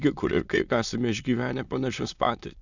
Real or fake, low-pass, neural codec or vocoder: fake; 7.2 kHz; autoencoder, 22.05 kHz, a latent of 192 numbers a frame, VITS, trained on many speakers